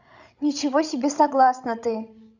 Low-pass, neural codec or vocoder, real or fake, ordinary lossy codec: 7.2 kHz; codec, 16 kHz, 16 kbps, FreqCodec, larger model; fake; none